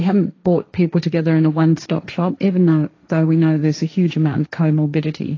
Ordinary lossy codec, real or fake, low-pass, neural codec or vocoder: AAC, 32 kbps; fake; 7.2 kHz; codec, 16 kHz, 1.1 kbps, Voila-Tokenizer